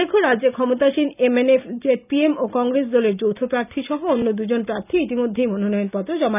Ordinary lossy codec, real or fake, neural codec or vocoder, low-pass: none; real; none; 3.6 kHz